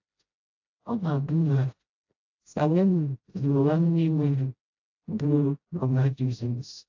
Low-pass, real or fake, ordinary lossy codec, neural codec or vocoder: 7.2 kHz; fake; AAC, 48 kbps; codec, 16 kHz, 0.5 kbps, FreqCodec, smaller model